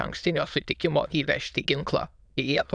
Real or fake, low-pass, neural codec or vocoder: fake; 9.9 kHz; autoencoder, 22.05 kHz, a latent of 192 numbers a frame, VITS, trained on many speakers